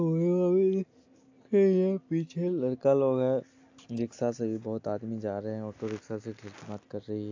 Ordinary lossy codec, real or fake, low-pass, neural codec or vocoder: AAC, 48 kbps; real; 7.2 kHz; none